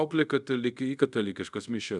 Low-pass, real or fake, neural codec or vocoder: 10.8 kHz; fake; codec, 24 kHz, 0.5 kbps, DualCodec